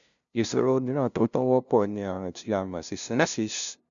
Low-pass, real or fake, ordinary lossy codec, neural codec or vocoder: 7.2 kHz; fake; none; codec, 16 kHz, 0.5 kbps, FunCodec, trained on LibriTTS, 25 frames a second